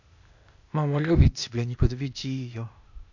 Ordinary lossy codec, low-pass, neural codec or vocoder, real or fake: none; 7.2 kHz; codec, 16 kHz, 0.8 kbps, ZipCodec; fake